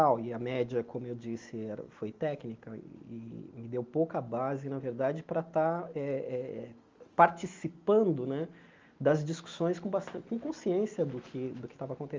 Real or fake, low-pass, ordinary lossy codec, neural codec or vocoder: real; 7.2 kHz; Opus, 32 kbps; none